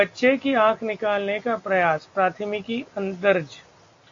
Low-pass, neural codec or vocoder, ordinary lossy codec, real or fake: 7.2 kHz; none; AAC, 48 kbps; real